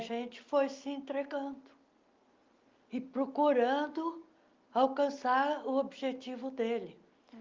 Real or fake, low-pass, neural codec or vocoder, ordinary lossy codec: real; 7.2 kHz; none; Opus, 24 kbps